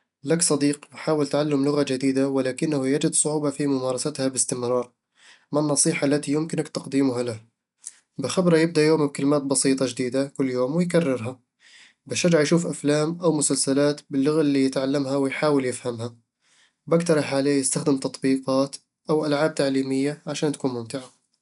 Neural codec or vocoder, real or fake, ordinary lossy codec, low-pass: none; real; none; 10.8 kHz